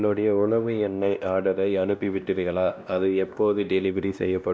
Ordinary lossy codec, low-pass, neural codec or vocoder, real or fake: none; none; codec, 16 kHz, 1 kbps, X-Codec, WavLM features, trained on Multilingual LibriSpeech; fake